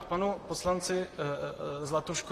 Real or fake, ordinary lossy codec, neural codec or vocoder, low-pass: fake; AAC, 48 kbps; vocoder, 44.1 kHz, 128 mel bands, Pupu-Vocoder; 14.4 kHz